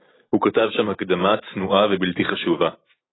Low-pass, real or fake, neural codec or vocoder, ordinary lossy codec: 7.2 kHz; real; none; AAC, 16 kbps